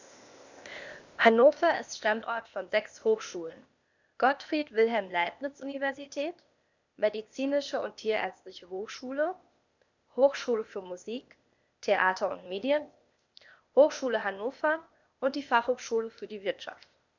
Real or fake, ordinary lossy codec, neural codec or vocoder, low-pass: fake; none; codec, 16 kHz, 0.8 kbps, ZipCodec; 7.2 kHz